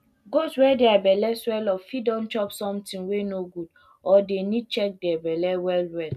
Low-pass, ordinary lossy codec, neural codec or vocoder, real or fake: 14.4 kHz; none; none; real